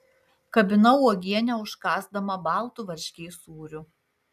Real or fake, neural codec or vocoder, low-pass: real; none; 14.4 kHz